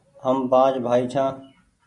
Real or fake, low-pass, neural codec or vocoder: real; 10.8 kHz; none